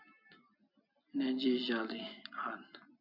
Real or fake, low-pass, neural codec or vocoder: real; 5.4 kHz; none